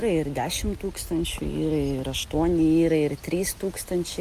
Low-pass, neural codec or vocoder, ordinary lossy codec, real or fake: 14.4 kHz; none; Opus, 32 kbps; real